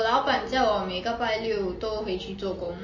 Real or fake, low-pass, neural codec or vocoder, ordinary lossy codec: real; 7.2 kHz; none; MP3, 32 kbps